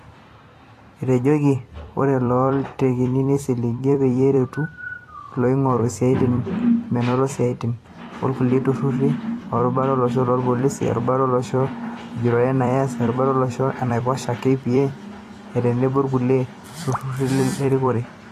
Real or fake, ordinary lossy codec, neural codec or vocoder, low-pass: fake; AAC, 48 kbps; vocoder, 44.1 kHz, 128 mel bands every 256 samples, BigVGAN v2; 14.4 kHz